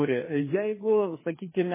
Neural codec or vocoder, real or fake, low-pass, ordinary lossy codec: codec, 16 kHz, 2 kbps, FreqCodec, larger model; fake; 3.6 kHz; MP3, 16 kbps